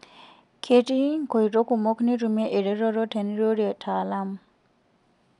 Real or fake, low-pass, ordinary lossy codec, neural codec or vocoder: real; 10.8 kHz; none; none